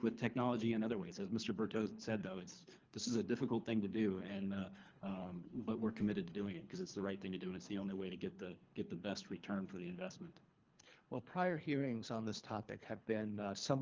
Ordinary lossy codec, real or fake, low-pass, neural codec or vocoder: Opus, 24 kbps; fake; 7.2 kHz; codec, 24 kHz, 3 kbps, HILCodec